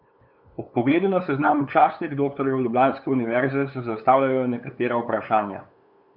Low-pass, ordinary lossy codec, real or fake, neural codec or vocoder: 5.4 kHz; none; fake; codec, 16 kHz, 8 kbps, FunCodec, trained on LibriTTS, 25 frames a second